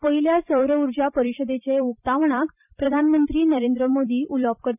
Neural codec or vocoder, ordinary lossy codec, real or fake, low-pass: vocoder, 44.1 kHz, 128 mel bands every 256 samples, BigVGAN v2; none; fake; 3.6 kHz